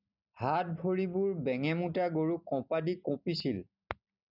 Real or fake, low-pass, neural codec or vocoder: real; 5.4 kHz; none